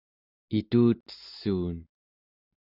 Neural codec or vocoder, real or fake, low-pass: none; real; 5.4 kHz